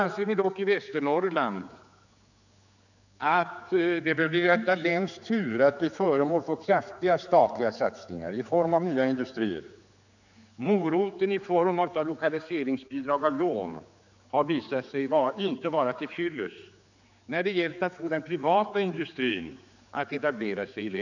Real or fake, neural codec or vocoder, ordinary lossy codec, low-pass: fake; codec, 16 kHz, 4 kbps, X-Codec, HuBERT features, trained on general audio; none; 7.2 kHz